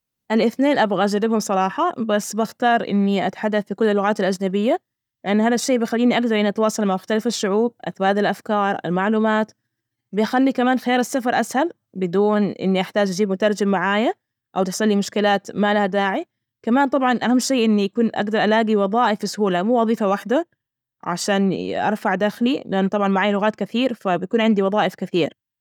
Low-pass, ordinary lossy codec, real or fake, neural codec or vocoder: 19.8 kHz; none; real; none